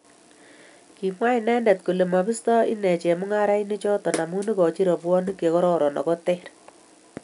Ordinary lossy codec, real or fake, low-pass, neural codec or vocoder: none; real; 10.8 kHz; none